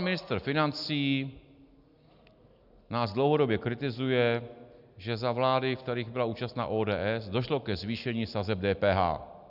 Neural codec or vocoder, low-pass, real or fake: none; 5.4 kHz; real